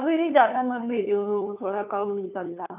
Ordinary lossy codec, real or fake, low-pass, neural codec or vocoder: AAC, 32 kbps; fake; 3.6 kHz; codec, 16 kHz, 2 kbps, FunCodec, trained on LibriTTS, 25 frames a second